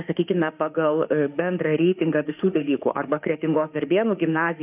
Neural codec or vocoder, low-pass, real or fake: autoencoder, 48 kHz, 32 numbers a frame, DAC-VAE, trained on Japanese speech; 3.6 kHz; fake